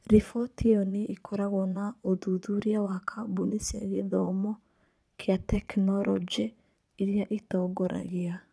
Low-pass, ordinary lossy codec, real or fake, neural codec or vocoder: none; none; fake; vocoder, 22.05 kHz, 80 mel bands, Vocos